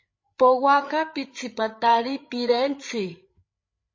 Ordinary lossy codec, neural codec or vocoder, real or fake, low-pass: MP3, 32 kbps; codec, 16 kHz, 8 kbps, FreqCodec, larger model; fake; 7.2 kHz